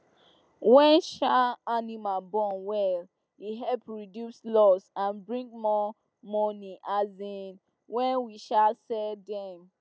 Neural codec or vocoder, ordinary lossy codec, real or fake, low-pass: none; none; real; none